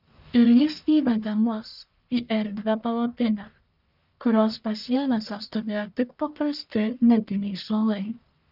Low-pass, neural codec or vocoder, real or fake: 5.4 kHz; codec, 44.1 kHz, 1.7 kbps, Pupu-Codec; fake